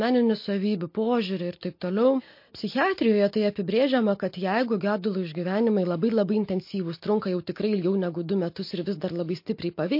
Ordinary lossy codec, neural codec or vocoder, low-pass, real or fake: MP3, 32 kbps; none; 5.4 kHz; real